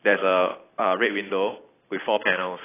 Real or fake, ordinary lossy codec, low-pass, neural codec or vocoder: fake; AAC, 16 kbps; 3.6 kHz; vocoder, 44.1 kHz, 128 mel bands every 256 samples, BigVGAN v2